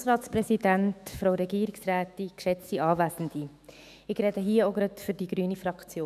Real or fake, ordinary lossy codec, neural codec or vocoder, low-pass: fake; none; autoencoder, 48 kHz, 128 numbers a frame, DAC-VAE, trained on Japanese speech; 14.4 kHz